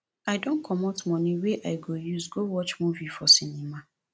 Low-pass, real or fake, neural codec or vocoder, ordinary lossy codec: none; real; none; none